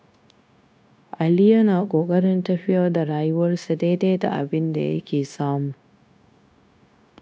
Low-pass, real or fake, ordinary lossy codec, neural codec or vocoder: none; fake; none; codec, 16 kHz, 0.9 kbps, LongCat-Audio-Codec